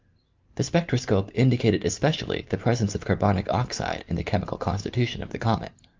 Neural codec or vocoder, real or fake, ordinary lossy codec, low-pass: none; real; Opus, 24 kbps; 7.2 kHz